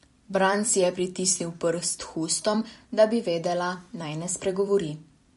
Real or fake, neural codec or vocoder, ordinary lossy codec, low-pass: fake; vocoder, 44.1 kHz, 128 mel bands every 256 samples, BigVGAN v2; MP3, 48 kbps; 14.4 kHz